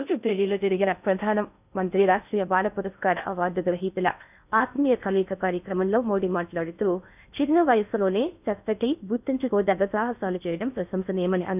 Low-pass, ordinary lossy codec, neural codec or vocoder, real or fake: 3.6 kHz; none; codec, 16 kHz in and 24 kHz out, 0.6 kbps, FocalCodec, streaming, 2048 codes; fake